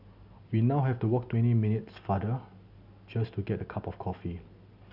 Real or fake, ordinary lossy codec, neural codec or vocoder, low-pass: real; none; none; 5.4 kHz